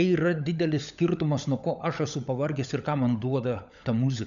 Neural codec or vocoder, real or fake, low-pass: codec, 16 kHz, 16 kbps, FunCodec, trained on LibriTTS, 50 frames a second; fake; 7.2 kHz